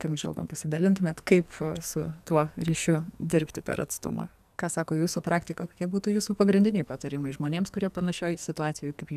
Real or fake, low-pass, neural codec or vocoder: fake; 14.4 kHz; codec, 44.1 kHz, 2.6 kbps, SNAC